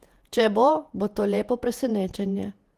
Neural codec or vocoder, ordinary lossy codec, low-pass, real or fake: vocoder, 48 kHz, 128 mel bands, Vocos; Opus, 24 kbps; 14.4 kHz; fake